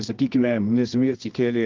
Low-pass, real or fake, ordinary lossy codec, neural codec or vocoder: 7.2 kHz; fake; Opus, 32 kbps; codec, 24 kHz, 0.9 kbps, WavTokenizer, medium music audio release